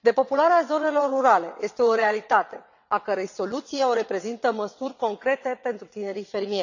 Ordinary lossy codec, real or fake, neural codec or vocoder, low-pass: none; fake; vocoder, 22.05 kHz, 80 mel bands, WaveNeXt; 7.2 kHz